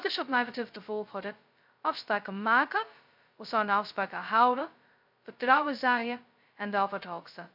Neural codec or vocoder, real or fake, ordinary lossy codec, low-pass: codec, 16 kHz, 0.2 kbps, FocalCodec; fake; MP3, 48 kbps; 5.4 kHz